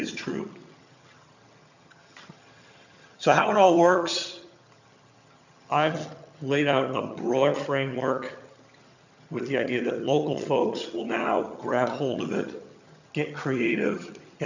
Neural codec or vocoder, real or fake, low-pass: vocoder, 22.05 kHz, 80 mel bands, HiFi-GAN; fake; 7.2 kHz